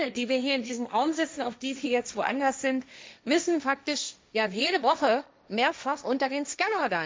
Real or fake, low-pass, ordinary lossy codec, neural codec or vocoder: fake; none; none; codec, 16 kHz, 1.1 kbps, Voila-Tokenizer